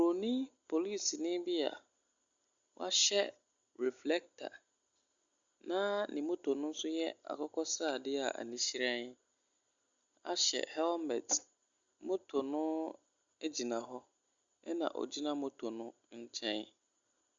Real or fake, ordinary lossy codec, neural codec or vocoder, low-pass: real; Opus, 64 kbps; none; 7.2 kHz